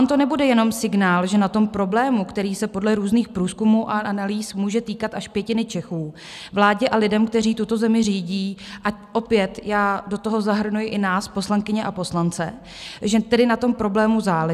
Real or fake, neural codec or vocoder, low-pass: real; none; 14.4 kHz